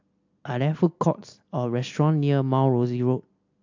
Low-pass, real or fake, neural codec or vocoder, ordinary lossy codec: 7.2 kHz; real; none; AAC, 48 kbps